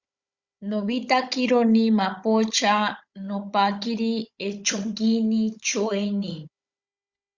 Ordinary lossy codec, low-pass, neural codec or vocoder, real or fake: Opus, 64 kbps; 7.2 kHz; codec, 16 kHz, 16 kbps, FunCodec, trained on Chinese and English, 50 frames a second; fake